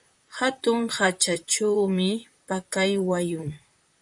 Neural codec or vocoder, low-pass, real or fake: vocoder, 44.1 kHz, 128 mel bands, Pupu-Vocoder; 10.8 kHz; fake